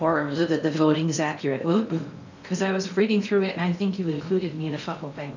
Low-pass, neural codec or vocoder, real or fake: 7.2 kHz; codec, 16 kHz in and 24 kHz out, 0.6 kbps, FocalCodec, streaming, 4096 codes; fake